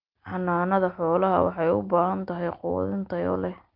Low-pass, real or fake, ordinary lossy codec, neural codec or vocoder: 5.4 kHz; real; Opus, 32 kbps; none